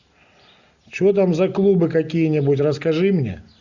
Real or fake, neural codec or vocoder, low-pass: real; none; 7.2 kHz